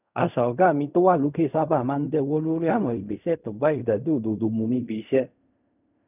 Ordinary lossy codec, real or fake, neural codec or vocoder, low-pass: none; fake; codec, 16 kHz in and 24 kHz out, 0.4 kbps, LongCat-Audio-Codec, fine tuned four codebook decoder; 3.6 kHz